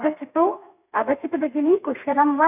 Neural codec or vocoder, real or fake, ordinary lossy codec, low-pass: codec, 32 kHz, 1.9 kbps, SNAC; fake; none; 3.6 kHz